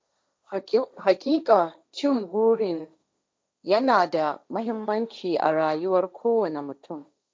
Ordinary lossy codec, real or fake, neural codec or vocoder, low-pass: none; fake; codec, 16 kHz, 1.1 kbps, Voila-Tokenizer; none